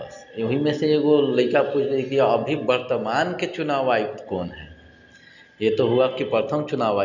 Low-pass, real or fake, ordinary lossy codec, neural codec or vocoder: 7.2 kHz; real; none; none